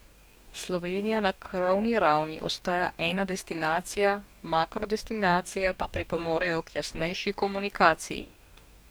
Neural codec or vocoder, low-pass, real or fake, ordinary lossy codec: codec, 44.1 kHz, 2.6 kbps, DAC; none; fake; none